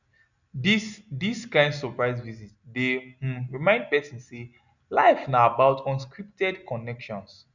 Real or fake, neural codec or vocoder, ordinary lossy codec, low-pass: real; none; none; 7.2 kHz